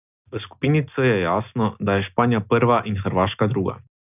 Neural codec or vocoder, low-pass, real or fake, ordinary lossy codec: none; 3.6 kHz; real; none